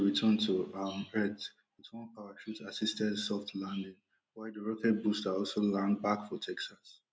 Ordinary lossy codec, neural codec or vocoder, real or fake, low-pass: none; none; real; none